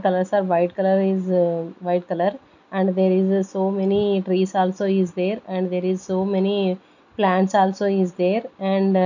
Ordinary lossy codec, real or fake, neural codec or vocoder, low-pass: none; real; none; 7.2 kHz